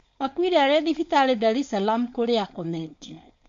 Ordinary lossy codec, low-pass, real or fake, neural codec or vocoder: MP3, 48 kbps; 7.2 kHz; fake; codec, 16 kHz, 4.8 kbps, FACodec